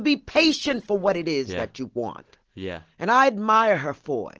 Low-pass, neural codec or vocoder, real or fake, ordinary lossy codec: 7.2 kHz; none; real; Opus, 16 kbps